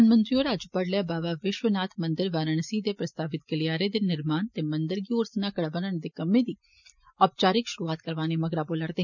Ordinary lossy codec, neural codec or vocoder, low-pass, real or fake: none; none; 7.2 kHz; real